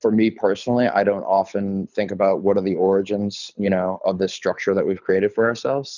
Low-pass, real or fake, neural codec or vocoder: 7.2 kHz; fake; codec, 24 kHz, 6 kbps, HILCodec